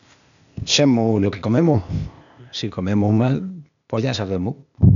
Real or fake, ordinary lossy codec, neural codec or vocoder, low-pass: fake; none; codec, 16 kHz, 0.8 kbps, ZipCodec; 7.2 kHz